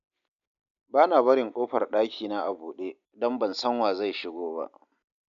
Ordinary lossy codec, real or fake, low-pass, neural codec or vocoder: none; real; 7.2 kHz; none